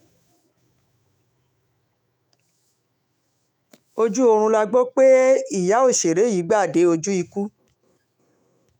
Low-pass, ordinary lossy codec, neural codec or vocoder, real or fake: 19.8 kHz; none; autoencoder, 48 kHz, 128 numbers a frame, DAC-VAE, trained on Japanese speech; fake